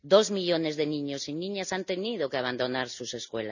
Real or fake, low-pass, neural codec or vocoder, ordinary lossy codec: real; 7.2 kHz; none; none